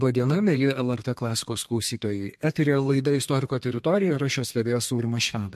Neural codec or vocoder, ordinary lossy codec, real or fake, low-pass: codec, 32 kHz, 1.9 kbps, SNAC; MP3, 64 kbps; fake; 14.4 kHz